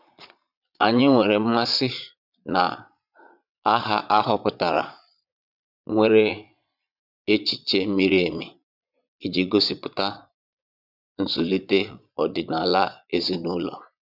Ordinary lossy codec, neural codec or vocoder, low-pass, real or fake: none; vocoder, 22.05 kHz, 80 mel bands, Vocos; 5.4 kHz; fake